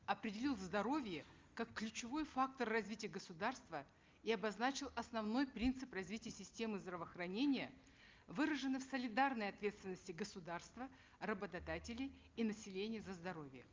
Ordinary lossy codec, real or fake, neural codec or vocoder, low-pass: Opus, 32 kbps; real; none; 7.2 kHz